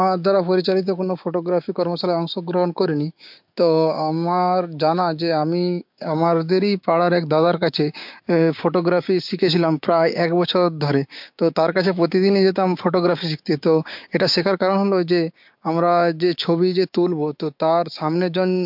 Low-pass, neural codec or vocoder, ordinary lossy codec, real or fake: 5.4 kHz; codec, 16 kHz, 6 kbps, DAC; MP3, 48 kbps; fake